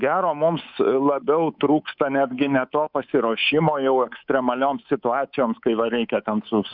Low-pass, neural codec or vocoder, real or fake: 5.4 kHz; codec, 24 kHz, 3.1 kbps, DualCodec; fake